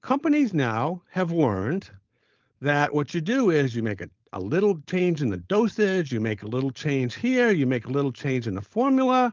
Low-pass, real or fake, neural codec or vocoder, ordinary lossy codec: 7.2 kHz; fake; codec, 16 kHz, 4.8 kbps, FACodec; Opus, 24 kbps